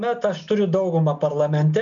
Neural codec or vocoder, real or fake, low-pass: none; real; 7.2 kHz